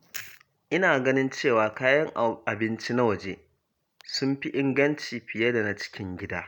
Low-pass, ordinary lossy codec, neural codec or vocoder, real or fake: 19.8 kHz; none; none; real